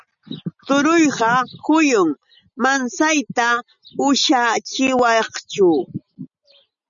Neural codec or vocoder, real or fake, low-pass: none; real; 7.2 kHz